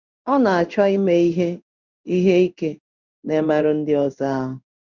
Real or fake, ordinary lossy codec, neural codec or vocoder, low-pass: fake; none; codec, 16 kHz in and 24 kHz out, 1 kbps, XY-Tokenizer; 7.2 kHz